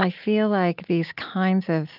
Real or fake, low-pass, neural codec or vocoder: real; 5.4 kHz; none